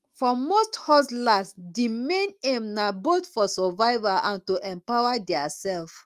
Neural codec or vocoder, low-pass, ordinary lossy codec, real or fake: autoencoder, 48 kHz, 128 numbers a frame, DAC-VAE, trained on Japanese speech; 14.4 kHz; Opus, 32 kbps; fake